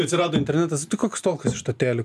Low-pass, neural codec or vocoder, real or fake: 14.4 kHz; none; real